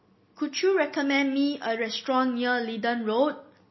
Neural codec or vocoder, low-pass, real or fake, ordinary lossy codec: none; 7.2 kHz; real; MP3, 24 kbps